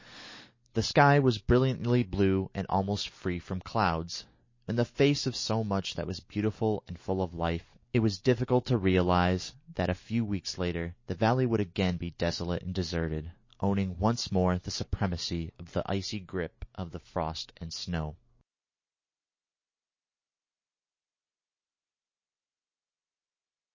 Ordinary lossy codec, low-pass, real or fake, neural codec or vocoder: MP3, 32 kbps; 7.2 kHz; real; none